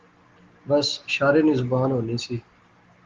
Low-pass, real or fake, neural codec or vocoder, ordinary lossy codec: 7.2 kHz; real; none; Opus, 16 kbps